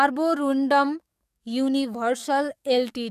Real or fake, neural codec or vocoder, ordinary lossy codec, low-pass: fake; codec, 44.1 kHz, 7.8 kbps, DAC; none; 14.4 kHz